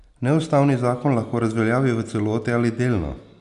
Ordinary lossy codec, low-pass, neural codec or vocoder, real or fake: none; 10.8 kHz; none; real